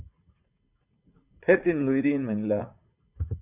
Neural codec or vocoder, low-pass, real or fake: vocoder, 44.1 kHz, 80 mel bands, Vocos; 3.6 kHz; fake